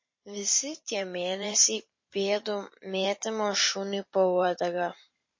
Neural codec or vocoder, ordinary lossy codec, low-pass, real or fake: vocoder, 44.1 kHz, 128 mel bands every 512 samples, BigVGAN v2; MP3, 32 kbps; 7.2 kHz; fake